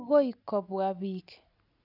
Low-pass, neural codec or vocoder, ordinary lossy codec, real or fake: 5.4 kHz; none; none; real